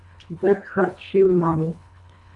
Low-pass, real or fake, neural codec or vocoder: 10.8 kHz; fake; codec, 24 kHz, 1.5 kbps, HILCodec